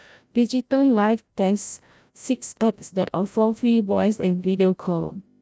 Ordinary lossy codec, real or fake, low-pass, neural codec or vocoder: none; fake; none; codec, 16 kHz, 0.5 kbps, FreqCodec, larger model